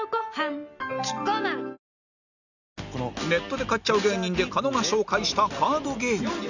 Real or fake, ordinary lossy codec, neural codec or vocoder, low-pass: real; none; none; 7.2 kHz